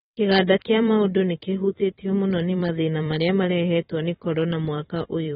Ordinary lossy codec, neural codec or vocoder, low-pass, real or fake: AAC, 16 kbps; vocoder, 44.1 kHz, 128 mel bands every 512 samples, BigVGAN v2; 19.8 kHz; fake